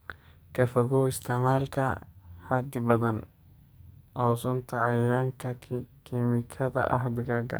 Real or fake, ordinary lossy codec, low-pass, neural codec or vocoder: fake; none; none; codec, 44.1 kHz, 2.6 kbps, SNAC